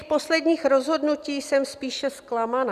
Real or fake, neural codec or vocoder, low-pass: real; none; 14.4 kHz